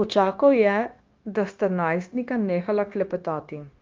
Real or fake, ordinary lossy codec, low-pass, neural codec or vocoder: fake; Opus, 32 kbps; 7.2 kHz; codec, 16 kHz, 0.9 kbps, LongCat-Audio-Codec